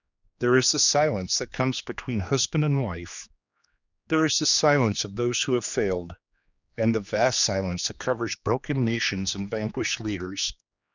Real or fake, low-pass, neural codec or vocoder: fake; 7.2 kHz; codec, 16 kHz, 2 kbps, X-Codec, HuBERT features, trained on general audio